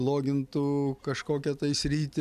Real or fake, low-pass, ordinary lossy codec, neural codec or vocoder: real; 14.4 kHz; AAC, 96 kbps; none